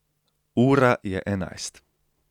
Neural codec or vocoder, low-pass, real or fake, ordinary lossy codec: none; 19.8 kHz; real; none